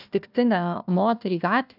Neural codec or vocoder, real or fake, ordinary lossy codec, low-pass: codec, 16 kHz, 1 kbps, FunCodec, trained on Chinese and English, 50 frames a second; fake; AAC, 48 kbps; 5.4 kHz